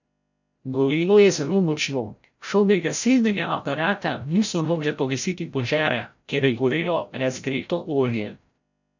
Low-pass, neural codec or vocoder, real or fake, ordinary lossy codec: 7.2 kHz; codec, 16 kHz, 0.5 kbps, FreqCodec, larger model; fake; none